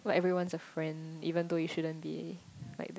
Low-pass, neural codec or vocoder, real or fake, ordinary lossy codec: none; none; real; none